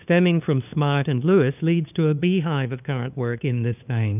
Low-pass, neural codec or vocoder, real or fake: 3.6 kHz; codec, 16 kHz, 2 kbps, FunCodec, trained on LibriTTS, 25 frames a second; fake